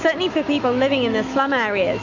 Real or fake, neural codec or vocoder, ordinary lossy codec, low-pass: fake; autoencoder, 48 kHz, 128 numbers a frame, DAC-VAE, trained on Japanese speech; MP3, 48 kbps; 7.2 kHz